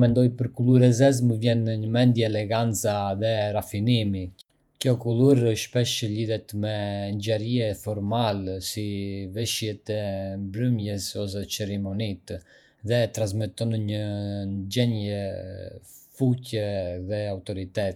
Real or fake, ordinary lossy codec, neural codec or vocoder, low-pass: fake; none; vocoder, 48 kHz, 128 mel bands, Vocos; 19.8 kHz